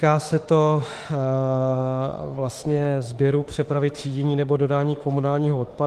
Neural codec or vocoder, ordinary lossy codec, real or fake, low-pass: autoencoder, 48 kHz, 32 numbers a frame, DAC-VAE, trained on Japanese speech; Opus, 24 kbps; fake; 14.4 kHz